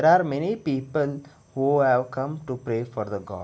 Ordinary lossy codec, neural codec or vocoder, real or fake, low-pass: none; none; real; none